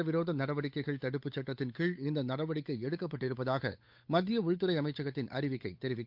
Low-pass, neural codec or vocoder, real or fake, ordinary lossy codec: 5.4 kHz; codec, 16 kHz, 8 kbps, FunCodec, trained on Chinese and English, 25 frames a second; fake; MP3, 48 kbps